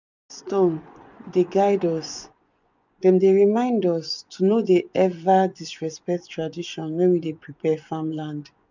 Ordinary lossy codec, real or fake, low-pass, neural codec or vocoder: none; fake; 7.2 kHz; vocoder, 24 kHz, 100 mel bands, Vocos